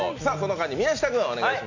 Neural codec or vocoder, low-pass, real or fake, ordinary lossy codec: none; 7.2 kHz; real; none